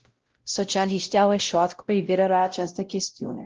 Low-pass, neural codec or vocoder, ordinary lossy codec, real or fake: 7.2 kHz; codec, 16 kHz, 0.5 kbps, X-Codec, WavLM features, trained on Multilingual LibriSpeech; Opus, 16 kbps; fake